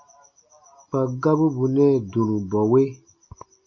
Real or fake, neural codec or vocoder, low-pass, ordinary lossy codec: real; none; 7.2 kHz; MP3, 32 kbps